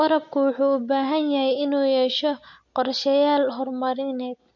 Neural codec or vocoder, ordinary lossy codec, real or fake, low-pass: none; MP3, 48 kbps; real; 7.2 kHz